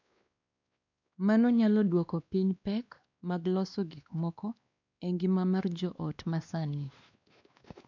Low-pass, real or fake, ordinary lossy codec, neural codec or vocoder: 7.2 kHz; fake; none; codec, 16 kHz, 2 kbps, X-Codec, WavLM features, trained on Multilingual LibriSpeech